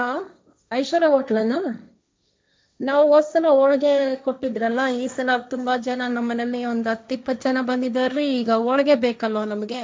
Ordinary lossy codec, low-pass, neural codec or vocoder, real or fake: none; none; codec, 16 kHz, 1.1 kbps, Voila-Tokenizer; fake